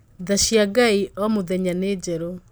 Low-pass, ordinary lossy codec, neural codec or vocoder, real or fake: none; none; none; real